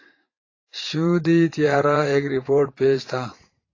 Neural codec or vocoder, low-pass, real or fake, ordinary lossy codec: vocoder, 44.1 kHz, 80 mel bands, Vocos; 7.2 kHz; fake; AAC, 32 kbps